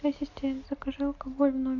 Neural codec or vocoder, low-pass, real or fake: none; 7.2 kHz; real